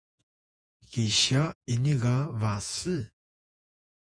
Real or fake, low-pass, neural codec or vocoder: fake; 9.9 kHz; vocoder, 48 kHz, 128 mel bands, Vocos